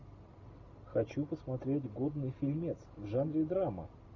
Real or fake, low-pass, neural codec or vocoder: real; 7.2 kHz; none